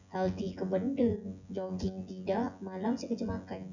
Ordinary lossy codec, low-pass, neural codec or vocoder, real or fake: none; 7.2 kHz; vocoder, 24 kHz, 100 mel bands, Vocos; fake